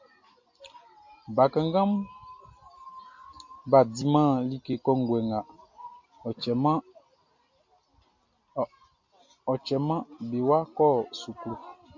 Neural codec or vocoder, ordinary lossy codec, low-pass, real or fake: none; MP3, 48 kbps; 7.2 kHz; real